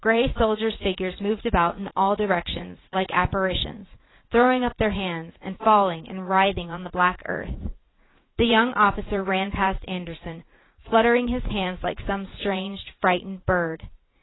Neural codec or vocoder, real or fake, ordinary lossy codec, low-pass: vocoder, 44.1 kHz, 80 mel bands, Vocos; fake; AAC, 16 kbps; 7.2 kHz